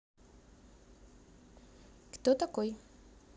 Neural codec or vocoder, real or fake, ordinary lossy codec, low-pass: none; real; none; none